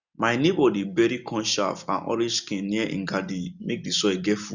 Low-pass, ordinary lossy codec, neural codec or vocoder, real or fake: 7.2 kHz; none; none; real